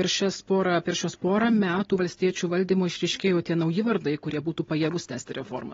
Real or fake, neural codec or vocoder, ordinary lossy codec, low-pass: real; none; AAC, 24 kbps; 7.2 kHz